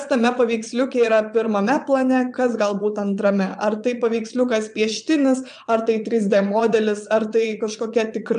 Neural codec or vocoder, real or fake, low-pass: vocoder, 22.05 kHz, 80 mel bands, Vocos; fake; 9.9 kHz